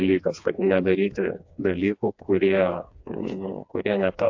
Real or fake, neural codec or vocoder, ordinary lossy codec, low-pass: fake; codec, 16 kHz, 2 kbps, FreqCodec, smaller model; AAC, 48 kbps; 7.2 kHz